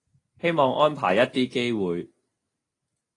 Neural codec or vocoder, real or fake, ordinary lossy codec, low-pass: none; real; AAC, 32 kbps; 10.8 kHz